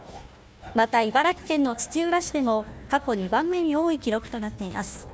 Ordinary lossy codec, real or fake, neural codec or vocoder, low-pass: none; fake; codec, 16 kHz, 1 kbps, FunCodec, trained on Chinese and English, 50 frames a second; none